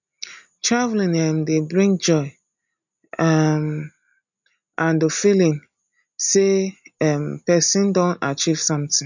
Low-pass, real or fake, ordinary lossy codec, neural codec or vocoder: 7.2 kHz; real; none; none